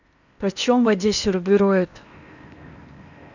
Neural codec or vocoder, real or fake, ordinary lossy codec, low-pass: codec, 16 kHz in and 24 kHz out, 0.8 kbps, FocalCodec, streaming, 65536 codes; fake; none; 7.2 kHz